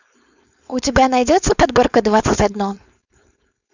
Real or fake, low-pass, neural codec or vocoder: fake; 7.2 kHz; codec, 16 kHz, 4.8 kbps, FACodec